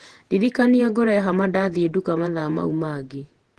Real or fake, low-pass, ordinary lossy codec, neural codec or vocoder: fake; 10.8 kHz; Opus, 16 kbps; vocoder, 48 kHz, 128 mel bands, Vocos